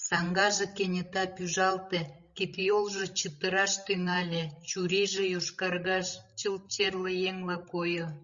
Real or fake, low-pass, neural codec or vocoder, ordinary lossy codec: fake; 7.2 kHz; codec, 16 kHz, 16 kbps, FreqCodec, larger model; Opus, 64 kbps